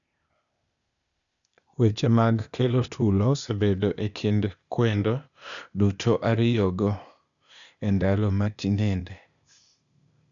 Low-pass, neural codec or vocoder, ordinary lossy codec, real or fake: 7.2 kHz; codec, 16 kHz, 0.8 kbps, ZipCodec; none; fake